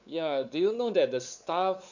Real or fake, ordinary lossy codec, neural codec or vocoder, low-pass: fake; none; codec, 16 kHz, 4 kbps, X-Codec, WavLM features, trained on Multilingual LibriSpeech; 7.2 kHz